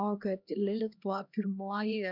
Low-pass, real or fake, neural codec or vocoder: 5.4 kHz; fake; codec, 16 kHz, 1 kbps, X-Codec, HuBERT features, trained on LibriSpeech